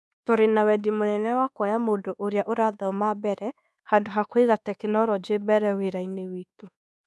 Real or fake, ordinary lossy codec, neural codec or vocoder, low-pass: fake; none; codec, 24 kHz, 1.2 kbps, DualCodec; none